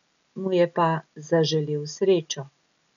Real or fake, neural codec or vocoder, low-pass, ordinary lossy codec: real; none; 7.2 kHz; none